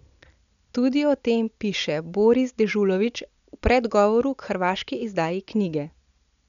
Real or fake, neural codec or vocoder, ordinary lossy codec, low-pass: real; none; none; 7.2 kHz